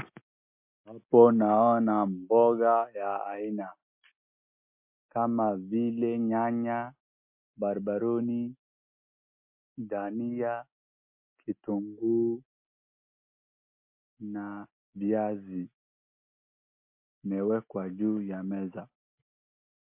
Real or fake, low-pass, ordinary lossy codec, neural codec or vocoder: real; 3.6 kHz; MP3, 32 kbps; none